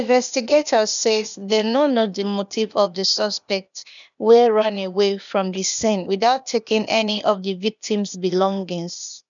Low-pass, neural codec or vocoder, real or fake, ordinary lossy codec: 7.2 kHz; codec, 16 kHz, 0.8 kbps, ZipCodec; fake; none